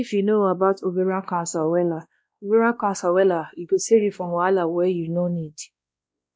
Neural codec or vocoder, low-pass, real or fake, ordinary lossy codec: codec, 16 kHz, 1 kbps, X-Codec, WavLM features, trained on Multilingual LibriSpeech; none; fake; none